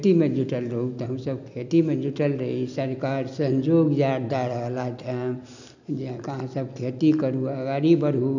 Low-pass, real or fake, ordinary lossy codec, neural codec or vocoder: 7.2 kHz; real; none; none